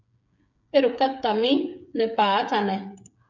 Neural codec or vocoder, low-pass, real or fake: codec, 16 kHz, 8 kbps, FreqCodec, smaller model; 7.2 kHz; fake